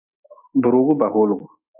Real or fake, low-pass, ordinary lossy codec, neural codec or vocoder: real; 3.6 kHz; AAC, 32 kbps; none